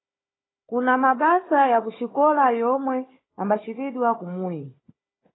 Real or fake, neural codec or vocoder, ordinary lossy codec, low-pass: fake; codec, 16 kHz, 4 kbps, FunCodec, trained on Chinese and English, 50 frames a second; AAC, 16 kbps; 7.2 kHz